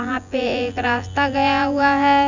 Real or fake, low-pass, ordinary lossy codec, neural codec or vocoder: fake; 7.2 kHz; none; vocoder, 24 kHz, 100 mel bands, Vocos